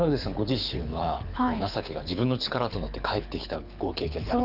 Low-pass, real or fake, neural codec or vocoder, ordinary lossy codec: 5.4 kHz; fake; codec, 24 kHz, 6 kbps, HILCodec; none